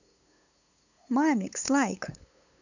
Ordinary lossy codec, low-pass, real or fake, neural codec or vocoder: none; 7.2 kHz; fake; codec, 16 kHz, 8 kbps, FunCodec, trained on LibriTTS, 25 frames a second